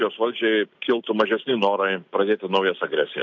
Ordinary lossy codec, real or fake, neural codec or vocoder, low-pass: MP3, 64 kbps; real; none; 7.2 kHz